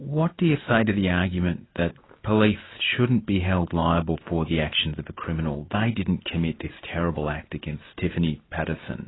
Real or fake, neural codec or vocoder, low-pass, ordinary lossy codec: real; none; 7.2 kHz; AAC, 16 kbps